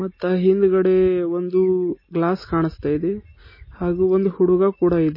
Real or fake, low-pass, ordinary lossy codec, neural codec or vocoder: real; 5.4 kHz; MP3, 24 kbps; none